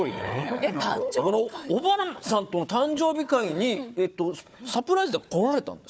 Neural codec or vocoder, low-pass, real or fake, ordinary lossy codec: codec, 16 kHz, 4 kbps, FunCodec, trained on Chinese and English, 50 frames a second; none; fake; none